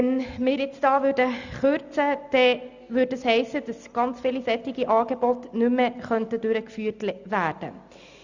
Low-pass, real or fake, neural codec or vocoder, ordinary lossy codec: 7.2 kHz; real; none; Opus, 64 kbps